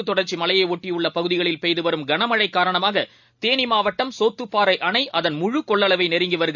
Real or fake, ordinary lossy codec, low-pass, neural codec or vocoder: real; none; 7.2 kHz; none